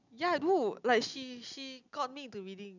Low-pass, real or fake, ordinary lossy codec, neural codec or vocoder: 7.2 kHz; real; none; none